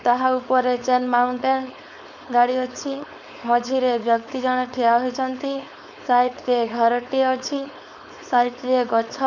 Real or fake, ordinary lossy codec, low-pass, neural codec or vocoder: fake; none; 7.2 kHz; codec, 16 kHz, 4.8 kbps, FACodec